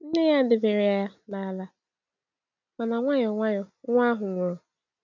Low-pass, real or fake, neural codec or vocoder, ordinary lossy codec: 7.2 kHz; real; none; none